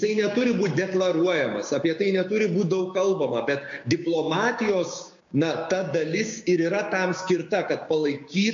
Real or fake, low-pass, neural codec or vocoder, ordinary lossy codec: fake; 7.2 kHz; codec, 16 kHz, 6 kbps, DAC; AAC, 64 kbps